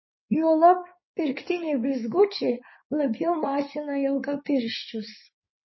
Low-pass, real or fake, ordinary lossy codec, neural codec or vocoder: 7.2 kHz; fake; MP3, 24 kbps; codec, 16 kHz in and 24 kHz out, 2.2 kbps, FireRedTTS-2 codec